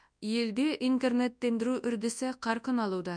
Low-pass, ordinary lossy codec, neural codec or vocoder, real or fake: 9.9 kHz; none; codec, 24 kHz, 0.9 kbps, WavTokenizer, large speech release; fake